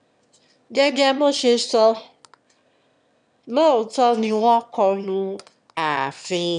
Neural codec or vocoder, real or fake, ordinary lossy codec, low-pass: autoencoder, 22.05 kHz, a latent of 192 numbers a frame, VITS, trained on one speaker; fake; none; 9.9 kHz